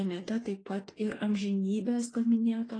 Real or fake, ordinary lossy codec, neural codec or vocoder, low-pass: fake; AAC, 32 kbps; autoencoder, 48 kHz, 32 numbers a frame, DAC-VAE, trained on Japanese speech; 9.9 kHz